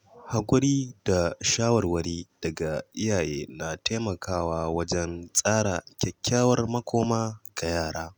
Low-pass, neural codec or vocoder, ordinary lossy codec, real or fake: none; none; none; real